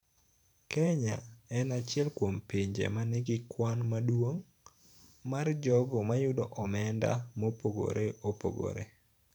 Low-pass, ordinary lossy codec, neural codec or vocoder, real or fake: 19.8 kHz; none; vocoder, 44.1 kHz, 128 mel bands every 512 samples, BigVGAN v2; fake